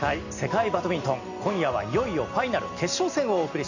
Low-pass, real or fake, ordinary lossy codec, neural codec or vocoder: 7.2 kHz; real; none; none